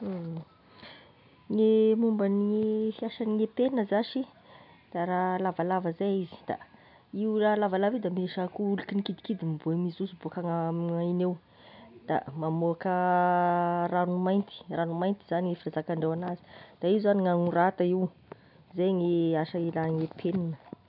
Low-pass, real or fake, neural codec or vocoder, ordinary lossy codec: 5.4 kHz; real; none; none